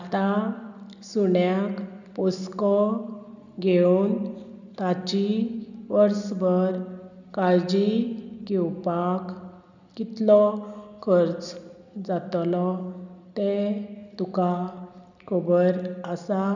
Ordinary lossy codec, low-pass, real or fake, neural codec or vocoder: none; 7.2 kHz; real; none